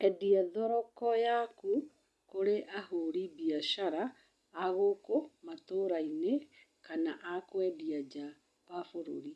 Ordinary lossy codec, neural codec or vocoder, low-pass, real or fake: none; none; none; real